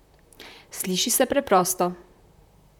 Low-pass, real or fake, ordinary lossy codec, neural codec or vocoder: 19.8 kHz; fake; none; vocoder, 44.1 kHz, 128 mel bands, Pupu-Vocoder